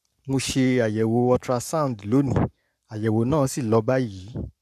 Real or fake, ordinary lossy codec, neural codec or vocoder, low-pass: fake; none; codec, 44.1 kHz, 7.8 kbps, Pupu-Codec; 14.4 kHz